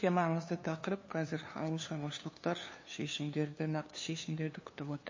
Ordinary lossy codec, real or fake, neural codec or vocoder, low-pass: MP3, 32 kbps; fake; codec, 16 kHz, 2 kbps, FunCodec, trained on LibriTTS, 25 frames a second; 7.2 kHz